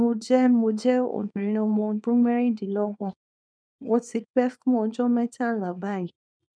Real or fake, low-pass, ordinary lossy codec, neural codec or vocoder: fake; 9.9 kHz; none; codec, 24 kHz, 0.9 kbps, WavTokenizer, small release